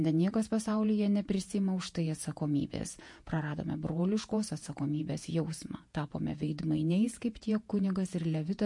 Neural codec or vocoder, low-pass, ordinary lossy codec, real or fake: vocoder, 48 kHz, 128 mel bands, Vocos; 10.8 kHz; MP3, 48 kbps; fake